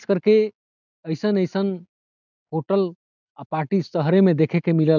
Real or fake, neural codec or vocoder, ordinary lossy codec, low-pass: real; none; none; 7.2 kHz